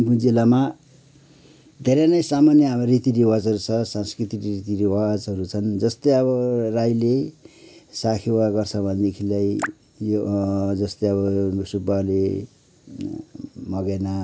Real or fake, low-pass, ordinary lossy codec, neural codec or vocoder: real; none; none; none